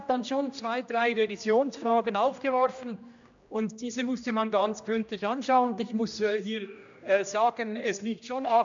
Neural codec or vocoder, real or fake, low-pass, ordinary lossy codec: codec, 16 kHz, 1 kbps, X-Codec, HuBERT features, trained on general audio; fake; 7.2 kHz; MP3, 64 kbps